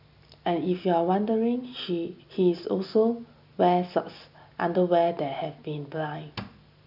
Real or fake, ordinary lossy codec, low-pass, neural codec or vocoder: real; none; 5.4 kHz; none